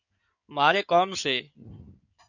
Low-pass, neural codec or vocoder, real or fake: 7.2 kHz; codec, 16 kHz in and 24 kHz out, 2.2 kbps, FireRedTTS-2 codec; fake